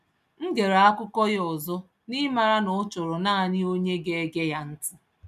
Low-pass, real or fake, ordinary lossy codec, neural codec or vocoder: 14.4 kHz; real; none; none